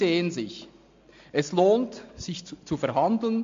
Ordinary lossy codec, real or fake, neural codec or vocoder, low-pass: MP3, 48 kbps; real; none; 7.2 kHz